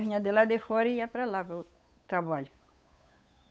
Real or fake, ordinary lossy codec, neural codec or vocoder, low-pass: fake; none; codec, 16 kHz, 8 kbps, FunCodec, trained on Chinese and English, 25 frames a second; none